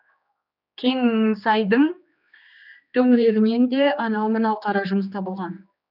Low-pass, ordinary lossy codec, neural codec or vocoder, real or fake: 5.4 kHz; none; codec, 16 kHz, 2 kbps, X-Codec, HuBERT features, trained on general audio; fake